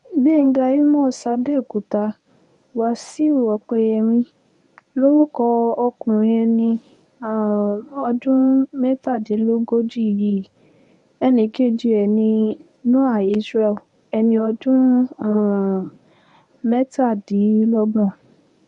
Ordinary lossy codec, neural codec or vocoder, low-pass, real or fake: MP3, 64 kbps; codec, 24 kHz, 0.9 kbps, WavTokenizer, medium speech release version 1; 10.8 kHz; fake